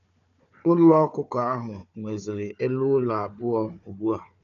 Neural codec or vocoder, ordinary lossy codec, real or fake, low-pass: codec, 16 kHz, 4 kbps, FunCodec, trained on Chinese and English, 50 frames a second; none; fake; 7.2 kHz